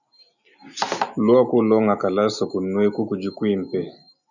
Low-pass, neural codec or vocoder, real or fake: 7.2 kHz; none; real